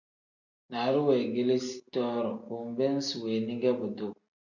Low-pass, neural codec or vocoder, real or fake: 7.2 kHz; none; real